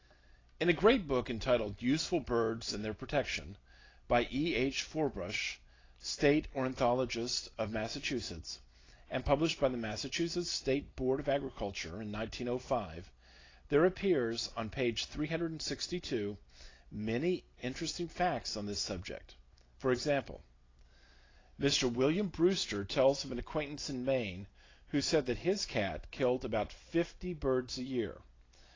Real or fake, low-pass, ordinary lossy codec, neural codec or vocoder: real; 7.2 kHz; AAC, 32 kbps; none